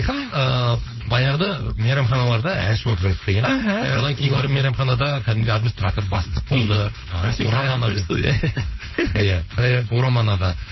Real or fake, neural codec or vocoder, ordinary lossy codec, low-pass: fake; codec, 16 kHz, 4.8 kbps, FACodec; MP3, 24 kbps; 7.2 kHz